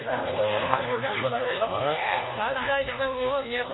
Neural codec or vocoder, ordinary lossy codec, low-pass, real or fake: codec, 24 kHz, 1.2 kbps, DualCodec; AAC, 16 kbps; 7.2 kHz; fake